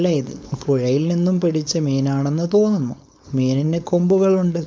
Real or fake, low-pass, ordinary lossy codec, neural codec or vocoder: fake; none; none; codec, 16 kHz, 4.8 kbps, FACodec